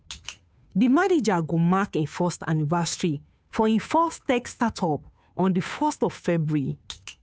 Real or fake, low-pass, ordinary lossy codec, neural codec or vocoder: fake; none; none; codec, 16 kHz, 2 kbps, FunCodec, trained on Chinese and English, 25 frames a second